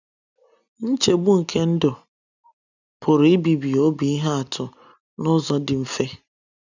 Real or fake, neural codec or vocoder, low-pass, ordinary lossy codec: real; none; 7.2 kHz; none